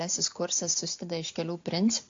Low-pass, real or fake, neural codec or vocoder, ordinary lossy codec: 7.2 kHz; real; none; AAC, 48 kbps